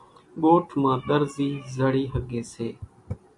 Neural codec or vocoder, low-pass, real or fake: none; 10.8 kHz; real